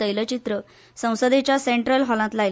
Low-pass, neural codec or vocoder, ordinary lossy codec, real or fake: none; none; none; real